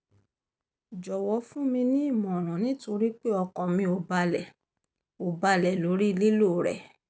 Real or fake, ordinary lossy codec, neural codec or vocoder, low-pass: real; none; none; none